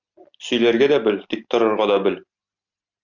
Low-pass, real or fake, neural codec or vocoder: 7.2 kHz; real; none